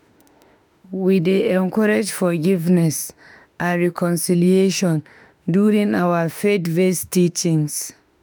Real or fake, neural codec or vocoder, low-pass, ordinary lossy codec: fake; autoencoder, 48 kHz, 32 numbers a frame, DAC-VAE, trained on Japanese speech; none; none